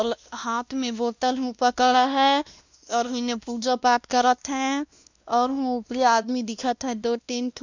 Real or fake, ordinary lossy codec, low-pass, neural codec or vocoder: fake; none; 7.2 kHz; codec, 16 kHz, 1 kbps, X-Codec, WavLM features, trained on Multilingual LibriSpeech